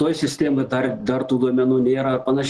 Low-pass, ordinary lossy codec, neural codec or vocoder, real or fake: 10.8 kHz; Opus, 16 kbps; none; real